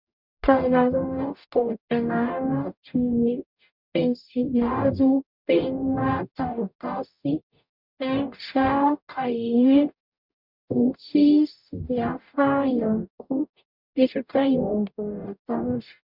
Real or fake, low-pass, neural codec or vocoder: fake; 5.4 kHz; codec, 44.1 kHz, 0.9 kbps, DAC